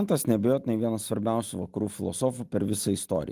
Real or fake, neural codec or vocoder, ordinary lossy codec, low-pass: real; none; Opus, 24 kbps; 14.4 kHz